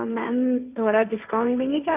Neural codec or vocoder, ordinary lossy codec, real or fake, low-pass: codec, 16 kHz, 1.1 kbps, Voila-Tokenizer; none; fake; 3.6 kHz